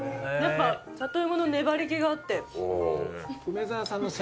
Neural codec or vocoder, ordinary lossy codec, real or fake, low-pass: none; none; real; none